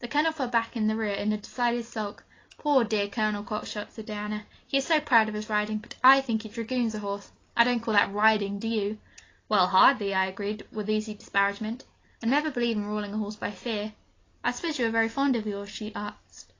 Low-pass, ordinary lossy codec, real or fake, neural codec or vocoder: 7.2 kHz; AAC, 32 kbps; real; none